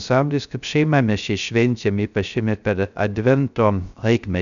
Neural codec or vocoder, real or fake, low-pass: codec, 16 kHz, 0.3 kbps, FocalCodec; fake; 7.2 kHz